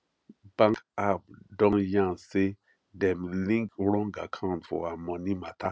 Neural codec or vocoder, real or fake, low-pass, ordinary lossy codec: none; real; none; none